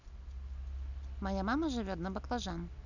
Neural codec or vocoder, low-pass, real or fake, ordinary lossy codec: none; 7.2 kHz; real; none